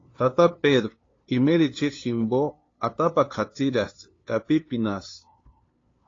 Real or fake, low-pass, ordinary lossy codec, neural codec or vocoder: fake; 7.2 kHz; AAC, 32 kbps; codec, 16 kHz, 2 kbps, FunCodec, trained on LibriTTS, 25 frames a second